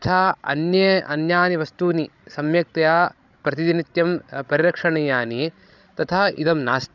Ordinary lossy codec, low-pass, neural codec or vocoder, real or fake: none; 7.2 kHz; codec, 16 kHz, 16 kbps, FreqCodec, larger model; fake